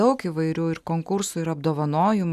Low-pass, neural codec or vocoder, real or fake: 14.4 kHz; none; real